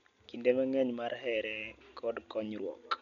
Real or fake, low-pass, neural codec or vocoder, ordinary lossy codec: real; 7.2 kHz; none; MP3, 96 kbps